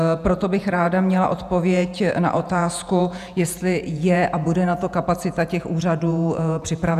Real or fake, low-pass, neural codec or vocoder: fake; 14.4 kHz; vocoder, 48 kHz, 128 mel bands, Vocos